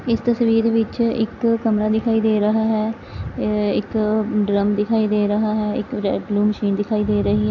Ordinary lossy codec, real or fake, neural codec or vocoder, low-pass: none; real; none; 7.2 kHz